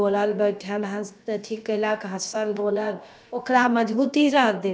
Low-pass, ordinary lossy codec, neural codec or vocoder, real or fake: none; none; codec, 16 kHz, about 1 kbps, DyCAST, with the encoder's durations; fake